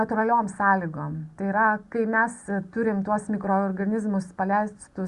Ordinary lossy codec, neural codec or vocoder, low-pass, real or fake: Opus, 64 kbps; none; 10.8 kHz; real